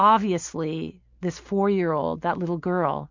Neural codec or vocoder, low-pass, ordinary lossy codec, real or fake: none; 7.2 kHz; MP3, 64 kbps; real